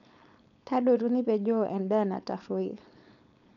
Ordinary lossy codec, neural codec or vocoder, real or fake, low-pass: none; codec, 16 kHz, 4.8 kbps, FACodec; fake; 7.2 kHz